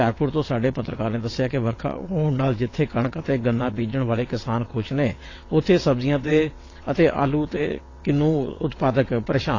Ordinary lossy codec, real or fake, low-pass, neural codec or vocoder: AAC, 32 kbps; fake; 7.2 kHz; vocoder, 22.05 kHz, 80 mel bands, WaveNeXt